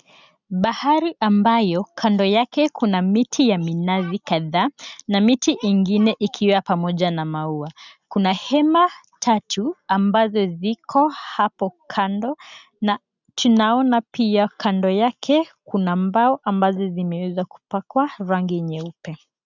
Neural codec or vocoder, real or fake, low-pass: none; real; 7.2 kHz